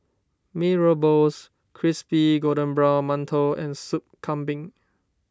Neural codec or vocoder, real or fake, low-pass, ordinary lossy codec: none; real; none; none